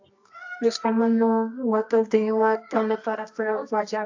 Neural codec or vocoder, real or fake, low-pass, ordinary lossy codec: codec, 24 kHz, 0.9 kbps, WavTokenizer, medium music audio release; fake; 7.2 kHz; AAC, 48 kbps